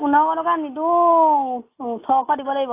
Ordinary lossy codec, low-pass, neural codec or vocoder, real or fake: AAC, 24 kbps; 3.6 kHz; none; real